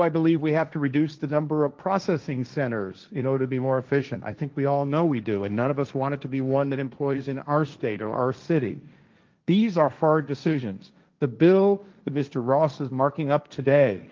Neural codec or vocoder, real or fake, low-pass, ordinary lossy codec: codec, 16 kHz, 1.1 kbps, Voila-Tokenizer; fake; 7.2 kHz; Opus, 24 kbps